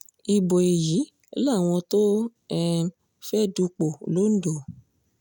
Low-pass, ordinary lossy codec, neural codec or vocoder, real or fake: 19.8 kHz; none; none; real